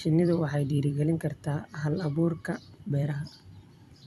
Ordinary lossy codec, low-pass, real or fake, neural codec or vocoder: none; 14.4 kHz; fake; vocoder, 44.1 kHz, 128 mel bands every 256 samples, BigVGAN v2